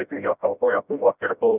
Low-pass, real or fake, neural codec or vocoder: 3.6 kHz; fake; codec, 16 kHz, 0.5 kbps, FreqCodec, smaller model